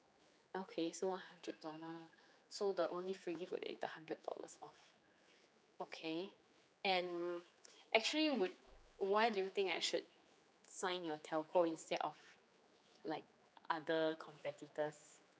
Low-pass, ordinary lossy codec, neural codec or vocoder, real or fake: none; none; codec, 16 kHz, 4 kbps, X-Codec, HuBERT features, trained on general audio; fake